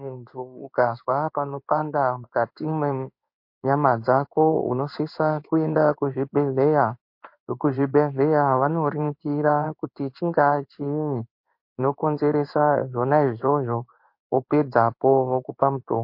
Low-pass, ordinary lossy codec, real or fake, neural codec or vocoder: 5.4 kHz; MP3, 32 kbps; fake; codec, 16 kHz in and 24 kHz out, 1 kbps, XY-Tokenizer